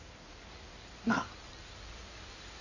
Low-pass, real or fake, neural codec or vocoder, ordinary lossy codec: 7.2 kHz; fake; codec, 16 kHz in and 24 kHz out, 2.2 kbps, FireRedTTS-2 codec; none